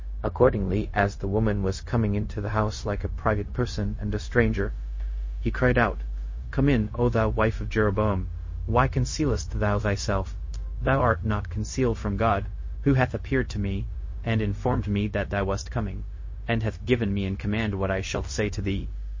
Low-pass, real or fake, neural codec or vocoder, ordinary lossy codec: 7.2 kHz; fake; codec, 16 kHz, 0.4 kbps, LongCat-Audio-Codec; MP3, 32 kbps